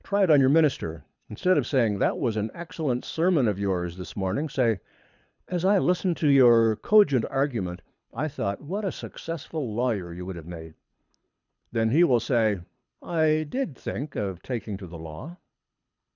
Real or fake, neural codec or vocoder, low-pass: fake; codec, 24 kHz, 6 kbps, HILCodec; 7.2 kHz